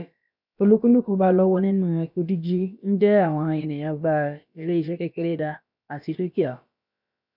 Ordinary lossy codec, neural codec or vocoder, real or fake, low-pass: none; codec, 16 kHz, about 1 kbps, DyCAST, with the encoder's durations; fake; 5.4 kHz